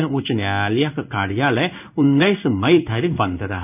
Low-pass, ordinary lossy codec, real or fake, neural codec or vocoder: 3.6 kHz; none; fake; codec, 16 kHz in and 24 kHz out, 1 kbps, XY-Tokenizer